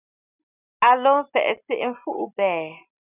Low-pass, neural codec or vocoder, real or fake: 3.6 kHz; none; real